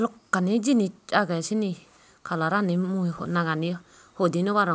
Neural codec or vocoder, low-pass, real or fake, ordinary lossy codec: none; none; real; none